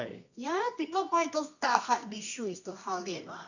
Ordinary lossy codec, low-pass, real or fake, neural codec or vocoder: none; 7.2 kHz; fake; codec, 24 kHz, 0.9 kbps, WavTokenizer, medium music audio release